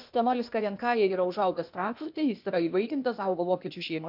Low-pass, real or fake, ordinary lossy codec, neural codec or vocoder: 5.4 kHz; fake; MP3, 48 kbps; codec, 16 kHz, 0.8 kbps, ZipCodec